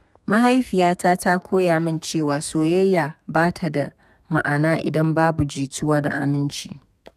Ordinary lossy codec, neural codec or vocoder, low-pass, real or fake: none; codec, 32 kHz, 1.9 kbps, SNAC; 14.4 kHz; fake